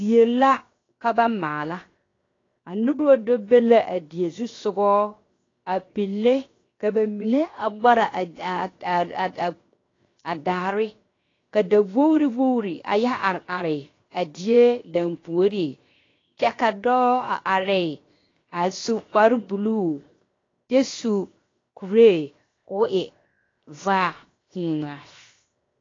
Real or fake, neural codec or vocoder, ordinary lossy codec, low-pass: fake; codec, 16 kHz, 0.7 kbps, FocalCodec; AAC, 32 kbps; 7.2 kHz